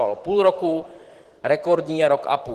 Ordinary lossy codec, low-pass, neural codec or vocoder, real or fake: Opus, 16 kbps; 14.4 kHz; vocoder, 44.1 kHz, 128 mel bands, Pupu-Vocoder; fake